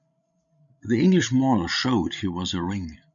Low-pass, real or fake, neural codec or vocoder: 7.2 kHz; fake; codec, 16 kHz, 16 kbps, FreqCodec, larger model